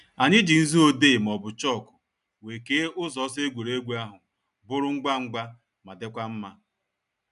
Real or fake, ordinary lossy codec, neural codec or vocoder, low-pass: real; none; none; 10.8 kHz